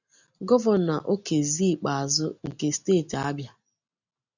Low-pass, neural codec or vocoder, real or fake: 7.2 kHz; none; real